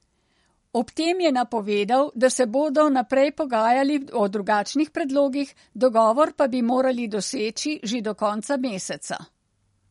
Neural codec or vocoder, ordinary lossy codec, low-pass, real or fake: none; MP3, 48 kbps; 19.8 kHz; real